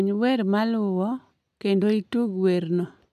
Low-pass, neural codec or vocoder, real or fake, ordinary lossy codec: 14.4 kHz; none; real; none